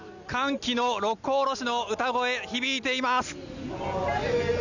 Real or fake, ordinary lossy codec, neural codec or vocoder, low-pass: real; none; none; 7.2 kHz